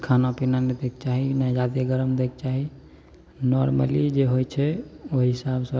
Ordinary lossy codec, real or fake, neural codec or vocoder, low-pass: none; real; none; none